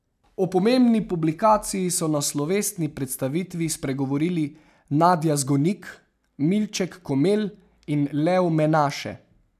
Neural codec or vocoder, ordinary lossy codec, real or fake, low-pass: none; none; real; 14.4 kHz